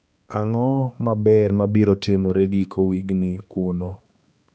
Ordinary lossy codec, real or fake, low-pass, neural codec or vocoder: none; fake; none; codec, 16 kHz, 2 kbps, X-Codec, HuBERT features, trained on balanced general audio